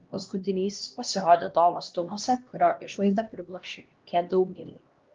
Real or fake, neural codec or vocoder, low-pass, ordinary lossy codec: fake; codec, 16 kHz, 1 kbps, X-Codec, HuBERT features, trained on LibriSpeech; 7.2 kHz; Opus, 32 kbps